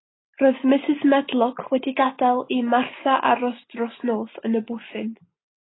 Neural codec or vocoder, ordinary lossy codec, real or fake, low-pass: none; AAC, 16 kbps; real; 7.2 kHz